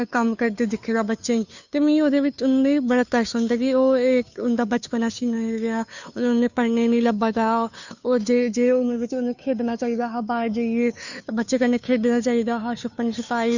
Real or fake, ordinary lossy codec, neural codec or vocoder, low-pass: fake; none; codec, 16 kHz, 2 kbps, FunCodec, trained on Chinese and English, 25 frames a second; 7.2 kHz